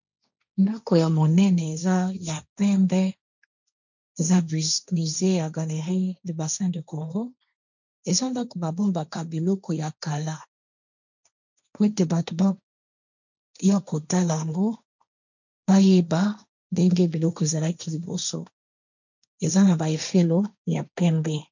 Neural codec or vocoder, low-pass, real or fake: codec, 16 kHz, 1.1 kbps, Voila-Tokenizer; 7.2 kHz; fake